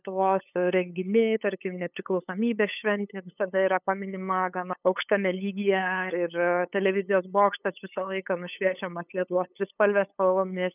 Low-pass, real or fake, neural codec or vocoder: 3.6 kHz; fake; codec, 16 kHz, 8 kbps, FunCodec, trained on LibriTTS, 25 frames a second